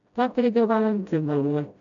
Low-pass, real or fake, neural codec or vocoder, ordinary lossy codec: 7.2 kHz; fake; codec, 16 kHz, 0.5 kbps, FreqCodec, smaller model; none